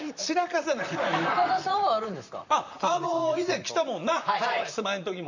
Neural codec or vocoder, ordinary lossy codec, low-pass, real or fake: vocoder, 44.1 kHz, 128 mel bands, Pupu-Vocoder; none; 7.2 kHz; fake